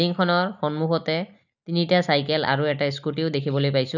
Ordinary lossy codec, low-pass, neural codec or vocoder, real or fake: none; 7.2 kHz; none; real